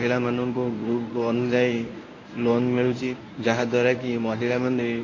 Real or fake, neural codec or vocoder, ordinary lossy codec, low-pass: fake; codec, 24 kHz, 0.9 kbps, WavTokenizer, medium speech release version 1; AAC, 32 kbps; 7.2 kHz